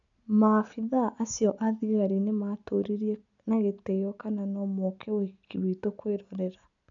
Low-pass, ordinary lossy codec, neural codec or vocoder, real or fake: 7.2 kHz; none; none; real